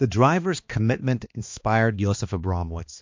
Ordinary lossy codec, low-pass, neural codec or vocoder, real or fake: MP3, 48 kbps; 7.2 kHz; codec, 16 kHz, 2 kbps, X-Codec, HuBERT features, trained on balanced general audio; fake